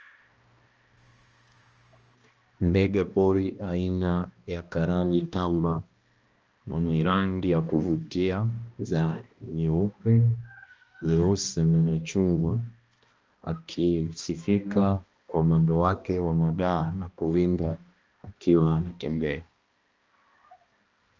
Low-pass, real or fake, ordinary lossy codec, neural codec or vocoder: 7.2 kHz; fake; Opus, 24 kbps; codec, 16 kHz, 1 kbps, X-Codec, HuBERT features, trained on balanced general audio